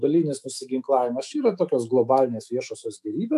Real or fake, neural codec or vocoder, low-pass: fake; autoencoder, 48 kHz, 128 numbers a frame, DAC-VAE, trained on Japanese speech; 14.4 kHz